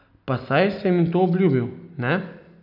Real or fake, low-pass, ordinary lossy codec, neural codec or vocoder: real; 5.4 kHz; none; none